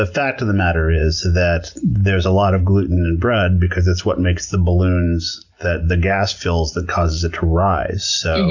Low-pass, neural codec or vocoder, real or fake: 7.2 kHz; none; real